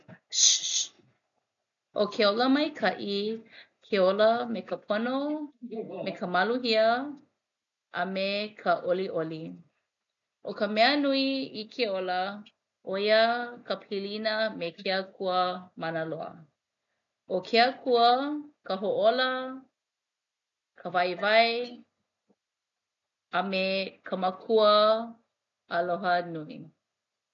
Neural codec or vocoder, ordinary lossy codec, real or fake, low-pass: none; none; real; 7.2 kHz